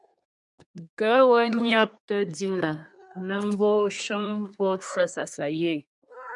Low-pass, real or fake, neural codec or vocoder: 10.8 kHz; fake; codec, 24 kHz, 1 kbps, SNAC